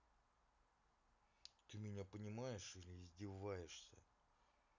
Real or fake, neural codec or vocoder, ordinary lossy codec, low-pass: real; none; none; 7.2 kHz